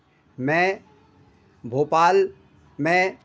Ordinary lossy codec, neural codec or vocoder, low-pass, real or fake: none; none; none; real